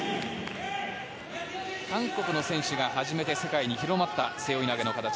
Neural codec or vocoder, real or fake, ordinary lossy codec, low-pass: none; real; none; none